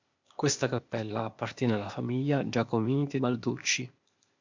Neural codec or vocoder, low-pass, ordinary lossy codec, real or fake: codec, 16 kHz, 0.8 kbps, ZipCodec; 7.2 kHz; MP3, 64 kbps; fake